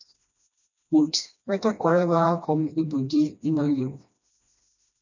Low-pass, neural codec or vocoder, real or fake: 7.2 kHz; codec, 16 kHz, 1 kbps, FreqCodec, smaller model; fake